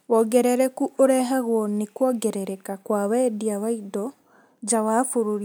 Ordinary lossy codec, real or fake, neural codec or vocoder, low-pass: none; real; none; none